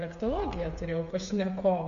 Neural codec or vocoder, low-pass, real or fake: codec, 16 kHz, 8 kbps, FreqCodec, smaller model; 7.2 kHz; fake